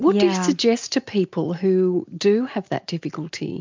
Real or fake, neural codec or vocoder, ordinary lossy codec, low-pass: real; none; MP3, 64 kbps; 7.2 kHz